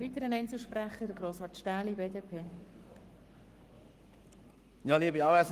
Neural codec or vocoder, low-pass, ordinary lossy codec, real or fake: codec, 44.1 kHz, 7.8 kbps, Pupu-Codec; 14.4 kHz; Opus, 24 kbps; fake